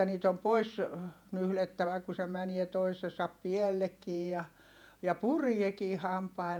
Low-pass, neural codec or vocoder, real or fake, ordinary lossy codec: 19.8 kHz; vocoder, 48 kHz, 128 mel bands, Vocos; fake; none